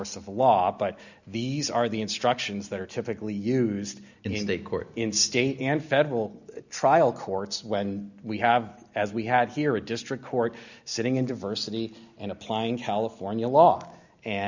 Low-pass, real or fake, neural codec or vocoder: 7.2 kHz; real; none